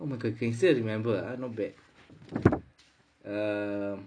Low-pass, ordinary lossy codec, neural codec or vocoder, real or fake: 9.9 kHz; none; none; real